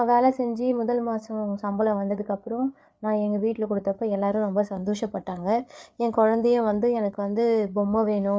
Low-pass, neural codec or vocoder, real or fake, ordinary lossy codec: none; codec, 16 kHz, 8 kbps, FreqCodec, larger model; fake; none